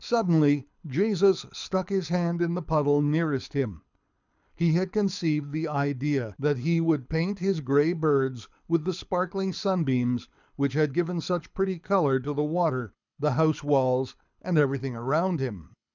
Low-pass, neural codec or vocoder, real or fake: 7.2 kHz; codec, 24 kHz, 6 kbps, HILCodec; fake